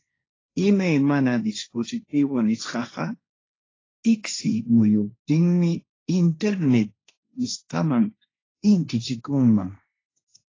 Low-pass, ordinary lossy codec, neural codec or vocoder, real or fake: 7.2 kHz; AAC, 32 kbps; codec, 16 kHz, 1.1 kbps, Voila-Tokenizer; fake